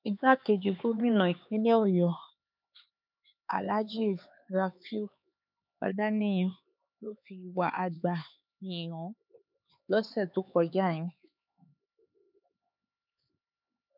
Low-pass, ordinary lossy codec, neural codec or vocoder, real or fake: 5.4 kHz; none; codec, 16 kHz, 4 kbps, X-Codec, HuBERT features, trained on LibriSpeech; fake